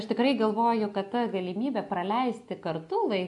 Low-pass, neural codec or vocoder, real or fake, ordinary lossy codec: 10.8 kHz; none; real; AAC, 64 kbps